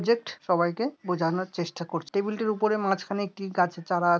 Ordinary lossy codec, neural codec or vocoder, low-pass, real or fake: none; none; none; real